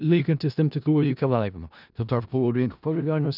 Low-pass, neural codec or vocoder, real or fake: 5.4 kHz; codec, 16 kHz in and 24 kHz out, 0.4 kbps, LongCat-Audio-Codec, four codebook decoder; fake